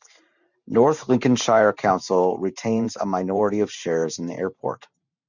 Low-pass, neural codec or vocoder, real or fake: 7.2 kHz; vocoder, 44.1 kHz, 128 mel bands every 512 samples, BigVGAN v2; fake